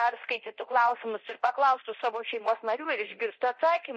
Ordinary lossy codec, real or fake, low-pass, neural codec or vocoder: MP3, 32 kbps; fake; 10.8 kHz; codec, 24 kHz, 0.9 kbps, DualCodec